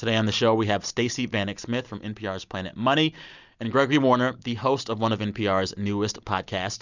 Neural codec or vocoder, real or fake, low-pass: none; real; 7.2 kHz